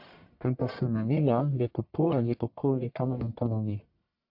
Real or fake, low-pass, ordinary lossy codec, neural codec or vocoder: fake; 5.4 kHz; AAC, 48 kbps; codec, 44.1 kHz, 1.7 kbps, Pupu-Codec